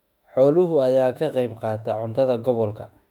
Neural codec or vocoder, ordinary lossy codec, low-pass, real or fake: autoencoder, 48 kHz, 32 numbers a frame, DAC-VAE, trained on Japanese speech; none; 19.8 kHz; fake